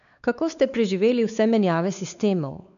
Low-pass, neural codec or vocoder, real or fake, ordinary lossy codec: 7.2 kHz; codec, 16 kHz, 4 kbps, X-Codec, WavLM features, trained on Multilingual LibriSpeech; fake; none